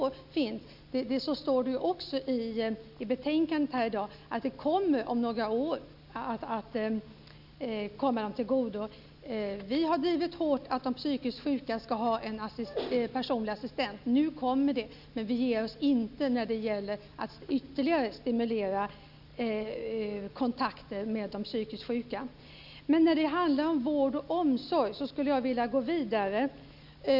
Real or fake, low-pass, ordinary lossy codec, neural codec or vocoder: real; 5.4 kHz; none; none